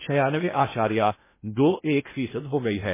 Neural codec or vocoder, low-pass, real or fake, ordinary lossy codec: codec, 16 kHz in and 24 kHz out, 0.6 kbps, FocalCodec, streaming, 2048 codes; 3.6 kHz; fake; MP3, 16 kbps